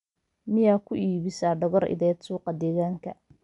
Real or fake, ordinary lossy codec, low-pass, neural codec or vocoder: real; none; 10.8 kHz; none